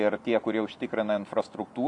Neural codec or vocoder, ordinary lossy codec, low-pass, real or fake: none; MP3, 64 kbps; 10.8 kHz; real